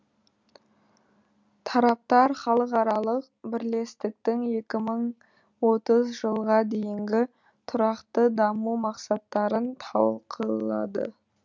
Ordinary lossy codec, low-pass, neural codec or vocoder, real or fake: none; 7.2 kHz; none; real